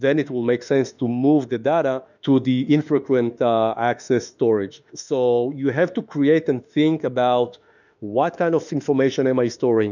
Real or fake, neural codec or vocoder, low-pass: fake; autoencoder, 48 kHz, 32 numbers a frame, DAC-VAE, trained on Japanese speech; 7.2 kHz